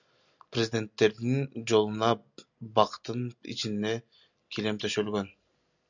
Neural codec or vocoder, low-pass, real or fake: none; 7.2 kHz; real